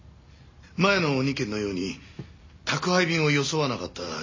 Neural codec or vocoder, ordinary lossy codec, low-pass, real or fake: none; MP3, 32 kbps; 7.2 kHz; real